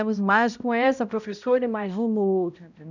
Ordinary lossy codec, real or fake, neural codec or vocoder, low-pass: none; fake; codec, 16 kHz, 0.5 kbps, X-Codec, HuBERT features, trained on balanced general audio; 7.2 kHz